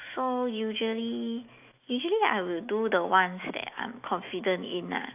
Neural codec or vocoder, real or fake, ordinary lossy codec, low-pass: autoencoder, 48 kHz, 128 numbers a frame, DAC-VAE, trained on Japanese speech; fake; AAC, 32 kbps; 3.6 kHz